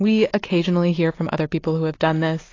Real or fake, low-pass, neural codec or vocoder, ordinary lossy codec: real; 7.2 kHz; none; AAC, 32 kbps